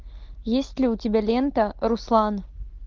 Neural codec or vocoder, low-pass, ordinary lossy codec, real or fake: none; 7.2 kHz; Opus, 32 kbps; real